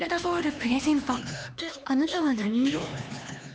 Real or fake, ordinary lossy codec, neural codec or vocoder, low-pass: fake; none; codec, 16 kHz, 2 kbps, X-Codec, HuBERT features, trained on LibriSpeech; none